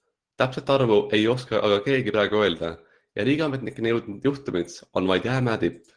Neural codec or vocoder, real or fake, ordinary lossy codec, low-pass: none; real; Opus, 16 kbps; 9.9 kHz